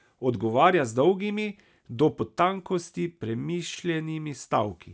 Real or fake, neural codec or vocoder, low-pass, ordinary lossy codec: real; none; none; none